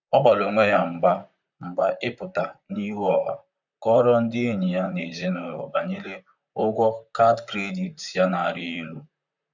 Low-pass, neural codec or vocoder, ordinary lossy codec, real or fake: 7.2 kHz; vocoder, 44.1 kHz, 128 mel bands, Pupu-Vocoder; none; fake